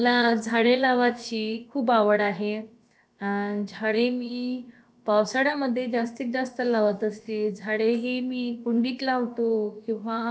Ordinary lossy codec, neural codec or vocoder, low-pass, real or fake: none; codec, 16 kHz, about 1 kbps, DyCAST, with the encoder's durations; none; fake